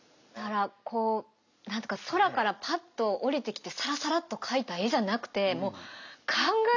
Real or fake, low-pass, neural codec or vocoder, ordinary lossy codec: real; 7.2 kHz; none; none